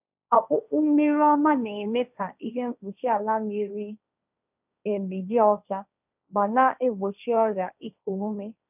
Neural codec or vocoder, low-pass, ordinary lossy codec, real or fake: codec, 16 kHz, 1.1 kbps, Voila-Tokenizer; 3.6 kHz; none; fake